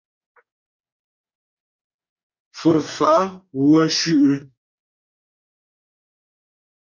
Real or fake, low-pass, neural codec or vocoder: fake; 7.2 kHz; codec, 44.1 kHz, 2.6 kbps, DAC